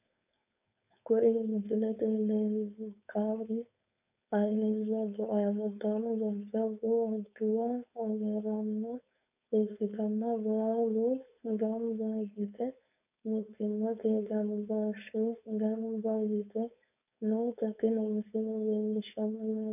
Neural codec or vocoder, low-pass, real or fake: codec, 16 kHz, 4.8 kbps, FACodec; 3.6 kHz; fake